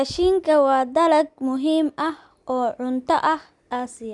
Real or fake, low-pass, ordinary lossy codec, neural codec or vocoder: real; 10.8 kHz; none; none